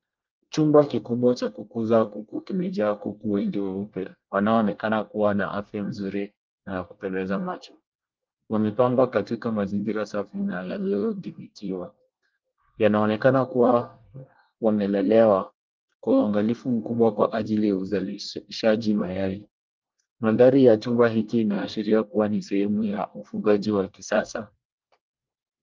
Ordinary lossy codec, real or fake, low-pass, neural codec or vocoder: Opus, 32 kbps; fake; 7.2 kHz; codec, 24 kHz, 1 kbps, SNAC